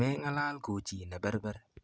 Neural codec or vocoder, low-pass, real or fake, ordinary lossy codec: none; none; real; none